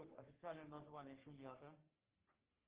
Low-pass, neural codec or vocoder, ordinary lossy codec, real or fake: 3.6 kHz; codec, 44.1 kHz, 3.4 kbps, Pupu-Codec; Opus, 16 kbps; fake